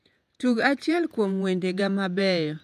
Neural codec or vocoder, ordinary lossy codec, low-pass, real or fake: vocoder, 48 kHz, 128 mel bands, Vocos; none; 14.4 kHz; fake